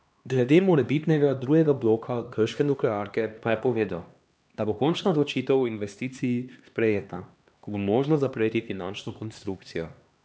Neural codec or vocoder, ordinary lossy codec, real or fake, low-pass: codec, 16 kHz, 2 kbps, X-Codec, HuBERT features, trained on LibriSpeech; none; fake; none